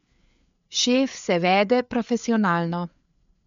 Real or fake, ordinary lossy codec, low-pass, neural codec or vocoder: fake; MP3, 64 kbps; 7.2 kHz; codec, 16 kHz, 4 kbps, FreqCodec, larger model